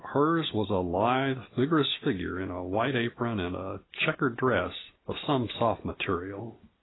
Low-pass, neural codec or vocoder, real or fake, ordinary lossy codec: 7.2 kHz; none; real; AAC, 16 kbps